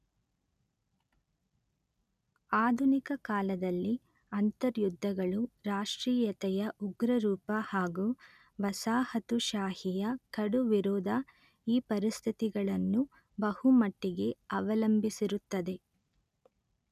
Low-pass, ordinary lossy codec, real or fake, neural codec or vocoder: 14.4 kHz; none; real; none